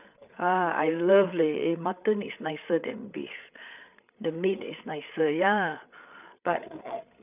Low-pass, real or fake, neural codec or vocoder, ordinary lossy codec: 3.6 kHz; fake; codec, 16 kHz, 8 kbps, FreqCodec, larger model; none